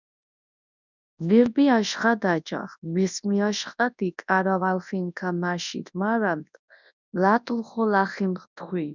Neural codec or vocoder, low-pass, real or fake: codec, 24 kHz, 0.9 kbps, WavTokenizer, large speech release; 7.2 kHz; fake